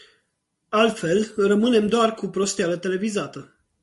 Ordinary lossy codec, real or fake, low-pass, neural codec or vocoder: MP3, 48 kbps; real; 14.4 kHz; none